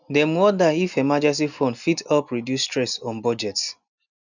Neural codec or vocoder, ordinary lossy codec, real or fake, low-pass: none; none; real; 7.2 kHz